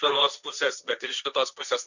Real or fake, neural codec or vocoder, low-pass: fake; codec, 16 kHz, 1.1 kbps, Voila-Tokenizer; 7.2 kHz